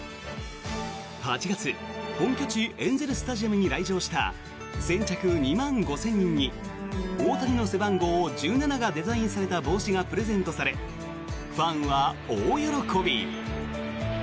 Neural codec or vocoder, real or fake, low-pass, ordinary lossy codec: none; real; none; none